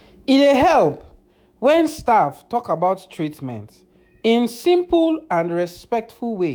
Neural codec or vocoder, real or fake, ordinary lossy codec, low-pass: autoencoder, 48 kHz, 128 numbers a frame, DAC-VAE, trained on Japanese speech; fake; none; none